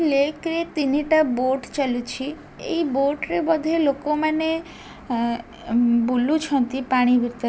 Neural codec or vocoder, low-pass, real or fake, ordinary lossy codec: none; none; real; none